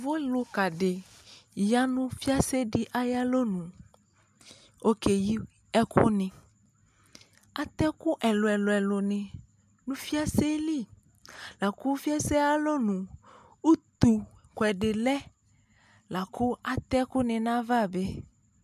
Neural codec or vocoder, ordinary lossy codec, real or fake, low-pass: none; MP3, 96 kbps; real; 14.4 kHz